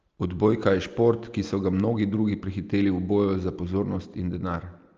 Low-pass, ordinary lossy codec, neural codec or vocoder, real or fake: 7.2 kHz; Opus, 24 kbps; none; real